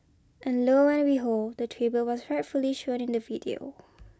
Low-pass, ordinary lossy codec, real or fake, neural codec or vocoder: none; none; real; none